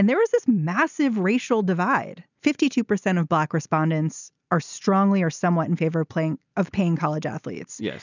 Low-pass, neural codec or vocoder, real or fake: 7.2 kHz; none; real